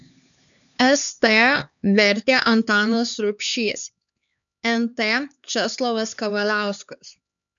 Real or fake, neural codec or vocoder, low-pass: fake; codec, 16 kHz, 4 kbps, X-Codec, HuBERT features, trained on LibriSpeech; 7.2 kHz